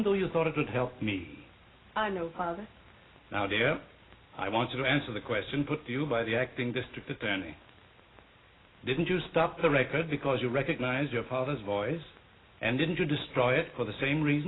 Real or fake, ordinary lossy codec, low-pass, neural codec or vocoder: real; AAC, 16 kbps; 7.2 kHz; none